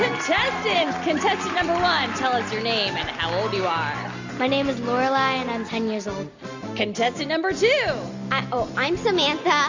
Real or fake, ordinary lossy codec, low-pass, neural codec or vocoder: real; AAC, 48 kbps; 7.2 kHz; none